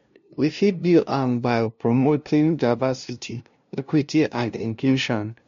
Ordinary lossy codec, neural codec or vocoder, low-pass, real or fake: MP3, 48 kbps; codec, 16 kHz, 0.5 kbps, FunCodec, trained on LibriTTS, 25 frames a second; 7.2 kHz; fake